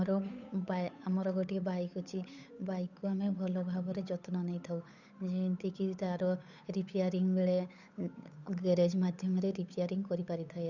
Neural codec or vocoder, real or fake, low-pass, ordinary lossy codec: codec, 16 kHz, 8 kbps, FunCodec, trained on Chinese and English, 25 frames a second; fake; 7.2 kHz; none